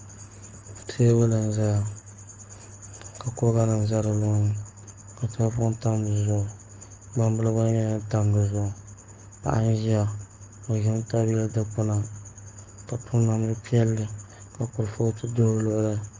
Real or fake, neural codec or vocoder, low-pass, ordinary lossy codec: fake; codec, 44.1 kHz, 7.8 kbps, Pupu-Codec; 7.2 kHz; Opus, 24 kbps